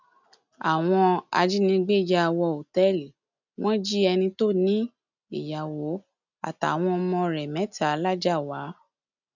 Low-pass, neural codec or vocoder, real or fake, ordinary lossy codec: 7.2 kHz; none; real; none